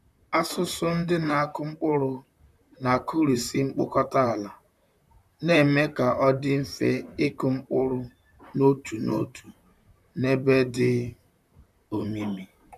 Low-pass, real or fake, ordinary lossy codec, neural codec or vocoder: 14.4 kHz; fake; none; vocoder, 44.1 kHz, 128 mel bands, Pupu-Vocoder